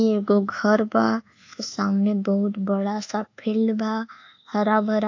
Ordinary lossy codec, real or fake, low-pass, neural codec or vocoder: AAC, 48 kbps; fake; 7.2 kHz; codec, 24 kHz, 1.2 kbps, DualCodec